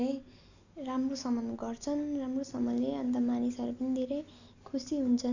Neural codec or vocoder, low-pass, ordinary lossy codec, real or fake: none; 7.2 kHz; none; real